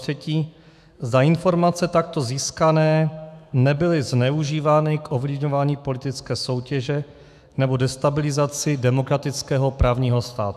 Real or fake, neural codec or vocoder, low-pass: fake; autoencoder, 48 kHz, 128 numbers a frame, DAC-VAE, trained on Japanese speech; 14.4 kHz